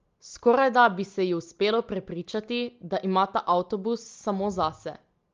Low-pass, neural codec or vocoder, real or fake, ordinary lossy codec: 7.2 kHz; none; real; Opus, 32 kbps